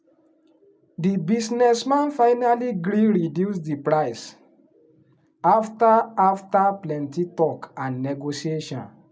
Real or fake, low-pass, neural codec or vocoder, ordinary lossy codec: real; none; none; none